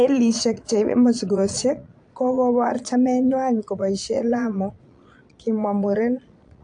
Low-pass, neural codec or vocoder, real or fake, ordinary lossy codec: 9.9 kHz; vocoder, 22.05 kHz, 80 mel bands, Vocos; fake; AAC, 64 kbps